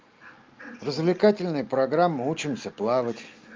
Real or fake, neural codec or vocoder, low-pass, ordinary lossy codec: real; none; 7.2 kHz; Opus, 24 kbps